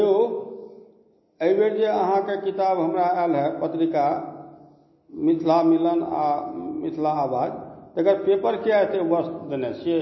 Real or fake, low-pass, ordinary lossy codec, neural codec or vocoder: real; 7.2 kHz; MP3, 24 kbps; none